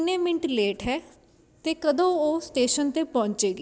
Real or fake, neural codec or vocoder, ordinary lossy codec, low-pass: real; none; none; none